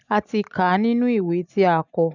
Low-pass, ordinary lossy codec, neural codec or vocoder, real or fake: 7.2 kHz; none; none; real